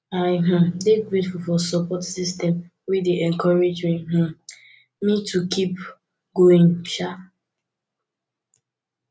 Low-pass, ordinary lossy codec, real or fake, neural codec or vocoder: none; none; real; none